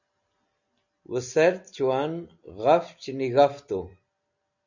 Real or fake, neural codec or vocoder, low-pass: real; none; 7.2 kHz